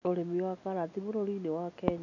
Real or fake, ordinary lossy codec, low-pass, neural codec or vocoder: real; none; 7.2 kHz; none